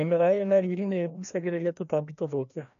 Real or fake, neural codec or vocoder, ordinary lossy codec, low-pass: fake; codec, 16 kHz, 1 kbps, FreqCodec, larger model; none; 7.2 kHz